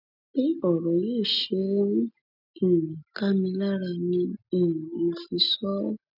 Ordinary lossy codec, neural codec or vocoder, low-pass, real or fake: none; none; 5.4 kHz; real